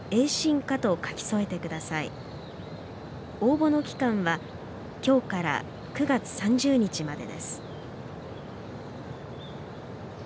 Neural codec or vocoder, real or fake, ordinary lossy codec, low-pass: none; real; none; none